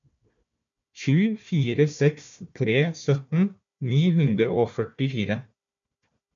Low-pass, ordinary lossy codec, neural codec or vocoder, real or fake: 7.2 kHz; MP3, 64 kbps; codec, 16 kHz, 1 kbps, FunCodec, trained on Chinese and English, 50 frames a second; fake